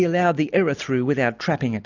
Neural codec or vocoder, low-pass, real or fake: none; 7.2 kHz; real